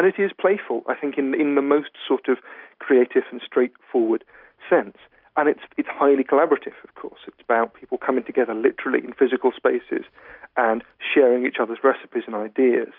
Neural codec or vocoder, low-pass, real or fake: none; 5.4 kHz; real